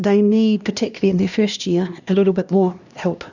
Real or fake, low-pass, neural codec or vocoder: fake; 7.2 kHz; codec, 16 kHz, 1 kbps, X-Codec, HuBERT features, trained on LibriSpeech